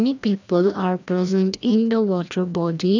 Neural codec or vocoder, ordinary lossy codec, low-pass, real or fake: codec, 16 kHz, 1 kbps, FreqCodec, larger model; none; 7.2 kHz; fake